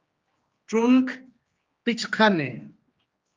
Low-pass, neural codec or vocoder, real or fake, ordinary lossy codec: 7.2 kHz; codec, 16 kHz, 2 kbps, X-Codec, HuBERT features, trained on general audio; fake; Opus, 24 kbps